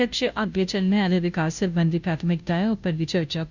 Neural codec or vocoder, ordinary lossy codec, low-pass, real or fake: codec, 16 kHz, 0.5 kbps, FunCodec, trained on Chinese and English, 25 frames a second; none; 7.2 kHz; fake